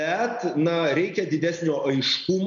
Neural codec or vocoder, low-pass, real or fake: none; 7.2 kHz; real